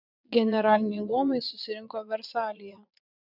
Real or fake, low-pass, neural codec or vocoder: fake; 5.4 kHz; vocoder, 22.05 kHz, 80 mel bands, Vocos